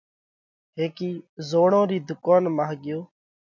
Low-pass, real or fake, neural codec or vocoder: 7.2 kHz; real; none